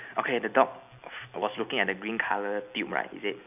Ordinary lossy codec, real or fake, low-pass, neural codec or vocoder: none; real; 3.6 kHz; none